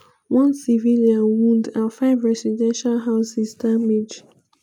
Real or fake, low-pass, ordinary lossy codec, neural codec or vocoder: real; 19.8 kHz; none; none